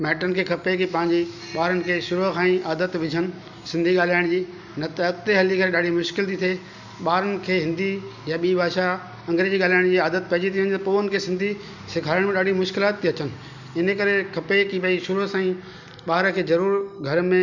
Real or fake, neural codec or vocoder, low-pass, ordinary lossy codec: real; none; 7.2 kHz; none